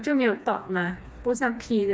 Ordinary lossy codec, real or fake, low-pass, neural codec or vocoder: none; fake; none; codec, 16 kHz, 2 kbps, FreqCodec, smaller model